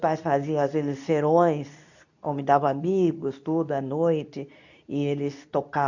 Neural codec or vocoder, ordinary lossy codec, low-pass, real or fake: codec, 24 kHz, 0.9 kbps, WavTokenizer, medium speech release version 2; none; 7.2 kHz; fake